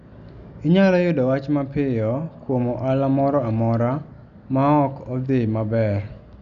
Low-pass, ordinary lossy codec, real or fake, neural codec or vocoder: 7.2 kHz; none; real; none